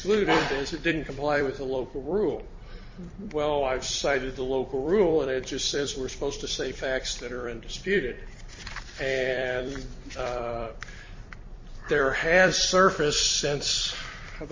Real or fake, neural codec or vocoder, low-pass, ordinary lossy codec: fake; vocoder, 44.1 kHz, 128 mel bands every 256 samples, BigVGAN v2; 7.2 kHz; MP3, 32 kbps